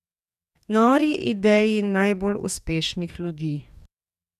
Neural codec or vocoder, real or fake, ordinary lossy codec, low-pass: codec, 44.1 kHz, 2.6 kbps, DAC; fake; none; 14.4 kHz